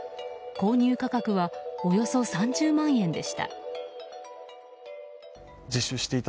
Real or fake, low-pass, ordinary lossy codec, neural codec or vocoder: real; none; none; none